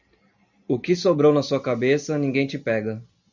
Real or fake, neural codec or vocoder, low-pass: real; none; 7.2 kHz